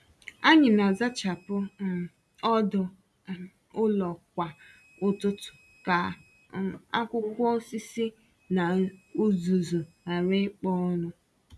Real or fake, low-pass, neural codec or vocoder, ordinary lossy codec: real; none; none; none